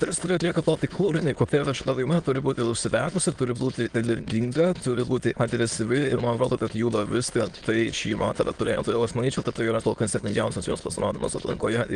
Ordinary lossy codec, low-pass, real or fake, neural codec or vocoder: Opus, 24 kbps; 9.9 kHz; fake; autoencoder, 22.05 kHz, a latent of 192 numbers a frame, VITS, trained on many speakers